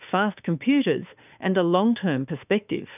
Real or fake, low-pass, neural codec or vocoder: fake; 3.6 kHz; autoencoder, 48 kHz, 32 numbers a frame, DAC-VAE, trained on Japanese speech